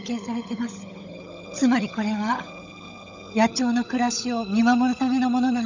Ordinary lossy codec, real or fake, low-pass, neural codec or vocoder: none; fake; 7.2 kHz; codec, 16 kHz, 16 kbps, FunCodec, trained on LibriTTS, 50 frames a second